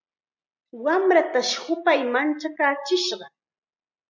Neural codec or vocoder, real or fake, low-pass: none; real; 7.2 kHz